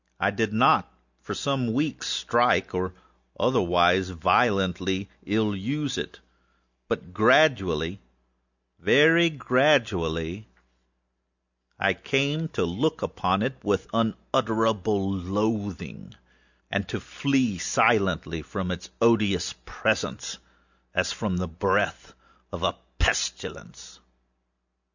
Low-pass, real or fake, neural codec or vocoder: 7.2 kHz; real; none